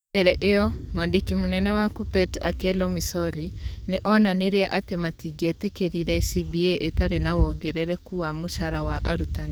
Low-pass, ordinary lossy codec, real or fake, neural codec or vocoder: none; none; fake; codec, 44.1 kHz, 2.6 kbps, SNAC